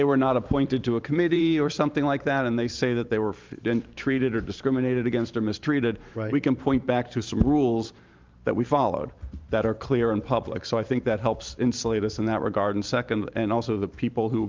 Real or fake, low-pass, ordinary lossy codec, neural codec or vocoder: fake; 7.2 kHz; Opus, 24 kbps; vocoder, 44.1 kHz, 128 mel bands every 512 samples, BigVGAN v2